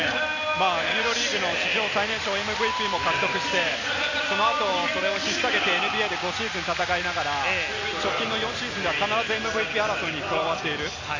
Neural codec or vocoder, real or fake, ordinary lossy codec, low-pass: none; real; AAC, 48 kbps; 7.2 kHz